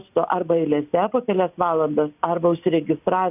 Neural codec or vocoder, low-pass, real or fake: none; 3.6 kHz; real